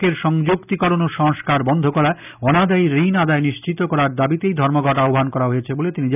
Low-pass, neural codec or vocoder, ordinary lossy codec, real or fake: 3.6 kHz; none; none; real